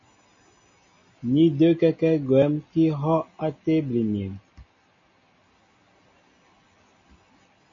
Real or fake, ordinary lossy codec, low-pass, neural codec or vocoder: real; MP3, 32 kbps; 7.2 kHz; none